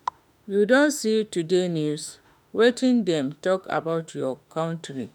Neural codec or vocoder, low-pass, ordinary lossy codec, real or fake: autoencoder, 48 kHz, 32 numbers a frame, DAC-VAE, trained on Japanese speech; 19.8 kHz; none; fake